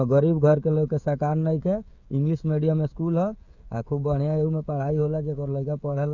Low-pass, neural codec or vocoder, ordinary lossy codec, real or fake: 7.2 kHz; codec, 16 kHz, 16 kbps, FreqCodec, smaller model; none; fake